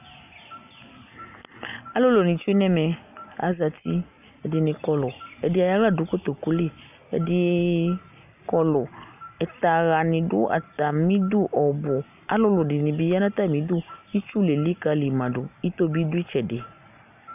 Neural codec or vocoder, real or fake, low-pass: none; real; 3.6 kHz